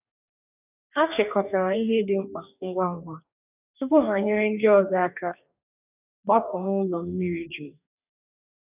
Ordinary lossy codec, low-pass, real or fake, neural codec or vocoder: none; 3.6 kHz; fake; codec, 44.1 kHz, 2.6 kbps, DAC